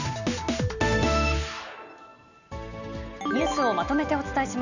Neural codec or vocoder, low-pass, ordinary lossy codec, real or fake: none; 7.2 kHz; none; real